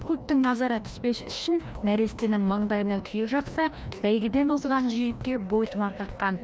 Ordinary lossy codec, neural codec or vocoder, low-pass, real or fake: none; codec, 16 kHz, 1 kbps, FreqCodec, larger model; none; fake